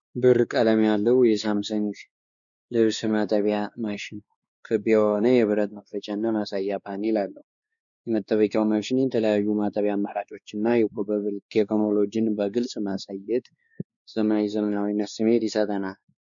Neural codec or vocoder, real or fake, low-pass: codec, 16 kHz, 2 kbps, X-Codec, WavLM features, trained on Multilingual LibriSpeech; fake; 7.2 kHz